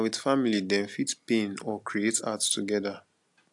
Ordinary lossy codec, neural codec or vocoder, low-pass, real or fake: AAC, 64 kbps; none; 10.8 kHz; real